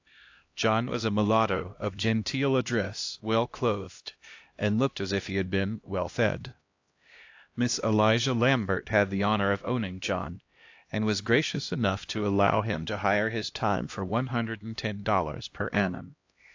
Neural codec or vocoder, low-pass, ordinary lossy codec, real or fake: codec, 16 kHz, 1 kbps, X-Codec, HuBERT features, trained on LibriSpeech; 7.2 kHz; AAC, 48 kbps; fake